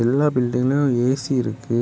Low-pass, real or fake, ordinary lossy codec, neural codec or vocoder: none; real; none; none